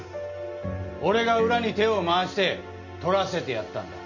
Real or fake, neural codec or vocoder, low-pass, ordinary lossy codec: real; none; 7.2 kHz; none